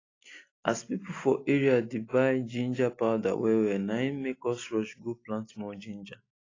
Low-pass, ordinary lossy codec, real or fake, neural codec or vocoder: 7.2 kHz; AAC, 32 kbps; real; none